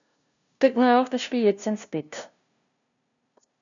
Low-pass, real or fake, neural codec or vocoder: 7.2 kHz; fake; codec, 16 kHz, 0.5 kbps, FunCodec, trained on LibriTTS, 25 frames a second